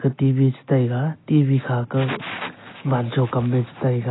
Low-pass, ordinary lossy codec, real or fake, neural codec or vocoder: 7.2 kHz; AAC, 16 kbps; real; none